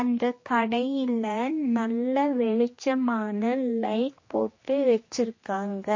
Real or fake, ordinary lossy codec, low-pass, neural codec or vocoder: fake; MP3, 32 kbps; 7.2 kHz; codec, 16 kHz, 2 kbps, X-Codec, HuBERT features, trained on general audio